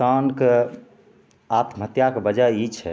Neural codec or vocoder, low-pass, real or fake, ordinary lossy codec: none; none; real; none